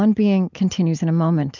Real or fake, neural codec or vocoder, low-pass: real; none; 7.2 kHz